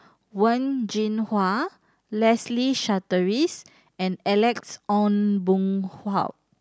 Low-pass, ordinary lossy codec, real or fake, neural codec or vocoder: none; none; real; none